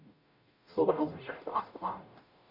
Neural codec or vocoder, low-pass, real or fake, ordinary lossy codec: codec, 44.1 kHz, 0.9 kbps, DAC; 5.4 kHz; fake; none